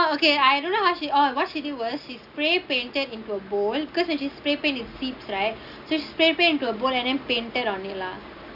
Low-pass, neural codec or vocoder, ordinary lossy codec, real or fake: 5.4 kHz; none; none; real